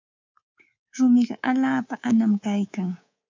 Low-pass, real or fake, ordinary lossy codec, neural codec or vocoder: 7.2 kHz; fake; MP3, 64 kbps; codec, 24 kHz, 3.1 kbps, DualCodec